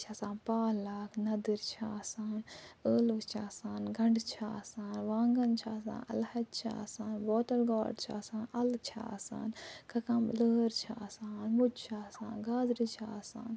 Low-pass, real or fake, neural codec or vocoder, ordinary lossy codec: none; real; none; none